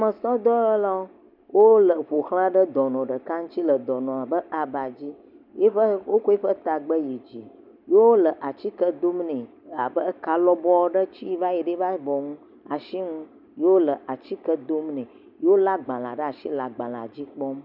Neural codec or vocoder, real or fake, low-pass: none; real; 5.4 kHz